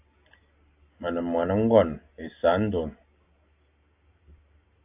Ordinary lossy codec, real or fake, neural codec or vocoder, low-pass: AAC, 32 kbps; real; none; 3.6 kHz